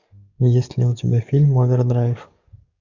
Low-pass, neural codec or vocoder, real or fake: 7.2 kHz; codec, 44.1 kHz, 7.8 kbps, DAC; fake